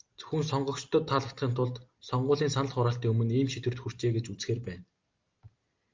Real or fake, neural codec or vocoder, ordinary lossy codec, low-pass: real; none; Opus, 32 kbps; 7.2 kHz